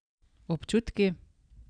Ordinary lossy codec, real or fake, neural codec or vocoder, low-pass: none; real; none; 9.9 kHz